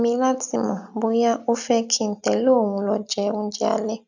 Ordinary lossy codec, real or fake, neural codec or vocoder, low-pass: none; real; none; 7.2 kHz